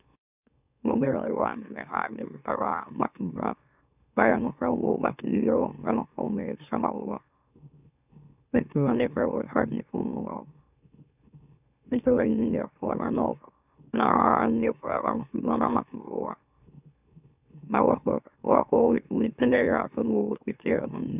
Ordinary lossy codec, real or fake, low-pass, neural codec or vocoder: none; fake; 3.6 kHz; autoencoder, 44.1 kHz, a latent of 192 numbers a frame, MeloTTS